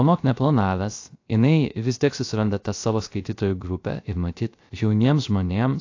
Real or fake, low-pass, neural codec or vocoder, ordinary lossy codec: fake; 7.2 kHz; codec, 16 kHz, 0.3 kbps, FocalCodec; AAC, 48 kbps